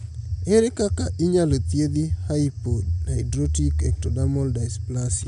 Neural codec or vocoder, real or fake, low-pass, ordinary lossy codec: none; real; 10.8 kHz; none